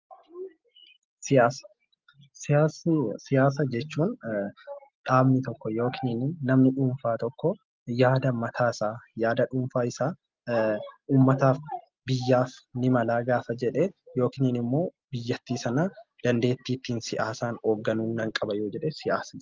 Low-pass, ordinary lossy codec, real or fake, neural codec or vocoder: 7.2 kHz; Opus, 32 kbps; real; none